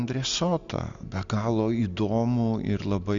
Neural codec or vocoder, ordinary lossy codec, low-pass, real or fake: none; Opus, 64 kbps; 7.2 kHz; real